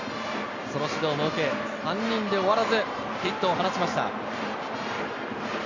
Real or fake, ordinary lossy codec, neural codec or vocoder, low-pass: real; Opus, 64 kbps; none; 7.2 kHz